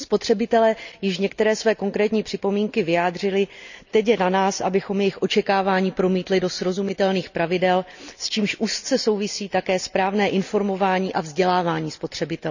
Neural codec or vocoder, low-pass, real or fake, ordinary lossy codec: none; 7.2 kHz; real; none